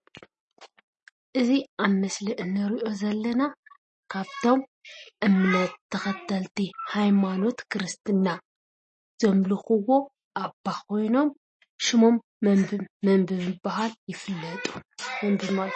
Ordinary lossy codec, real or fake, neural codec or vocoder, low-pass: MP3, 32 kbps; real; none; 10.8 kHz